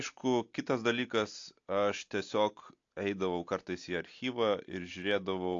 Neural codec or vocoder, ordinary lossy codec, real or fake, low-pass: none; AAC, 48 kbps; real; 7.2 kHz